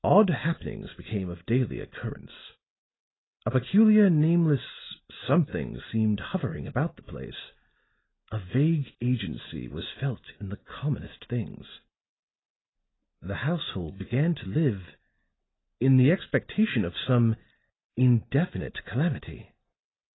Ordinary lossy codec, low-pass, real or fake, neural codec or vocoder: AAC, 16 kbps; 7.2 kHz; real; none